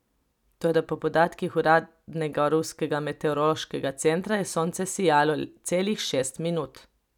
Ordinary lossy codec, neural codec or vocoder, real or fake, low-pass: none; none; real; 19.8 kHz